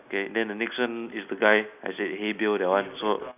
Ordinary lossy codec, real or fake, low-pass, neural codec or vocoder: none; real; 3.6 kHz; none